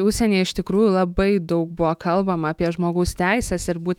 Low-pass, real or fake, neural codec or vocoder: 19.8 kHz; fake; autoencoder, 48 kHz, 128 numbers a frame, DAC-VAE, trained on Japanese speech